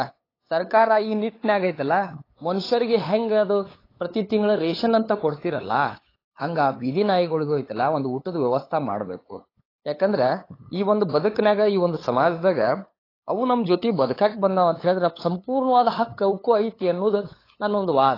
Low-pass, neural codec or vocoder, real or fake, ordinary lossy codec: 5.4 kHz; codec, 16 kHz, 8 kbps, FunCodec, trained on LibriTTS, 25 frames a second; fake; AAC, 24 kbps